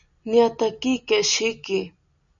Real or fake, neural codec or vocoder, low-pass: real; none; 7.2 kHz